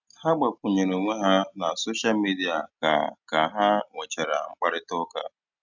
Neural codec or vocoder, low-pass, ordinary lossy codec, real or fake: none; 7.2 kHz; none; real